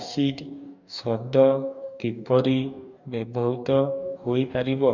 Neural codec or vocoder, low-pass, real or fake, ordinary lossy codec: codec, 44.1 kHz, 2.6 kbps, DAC; 7.2 kHz; fake; none